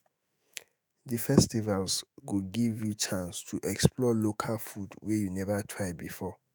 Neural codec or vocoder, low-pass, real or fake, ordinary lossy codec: autoencoder, 48 kHz, 128 numbers a frame, DAC-VAE, trained on Japanese speech; none; fake; none